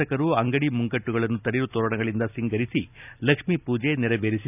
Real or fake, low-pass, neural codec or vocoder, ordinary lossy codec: real; 3.6 kHz; none; none